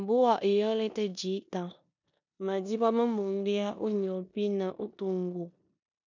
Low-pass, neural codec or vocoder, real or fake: 7.2 kHz; codec, 16 kHz in and 24 kHz out, 0.9 kbps, LongCat-Audio-Codec, four codebook decoder; fake